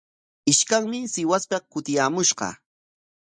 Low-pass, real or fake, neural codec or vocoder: 9.9 kHz; real; none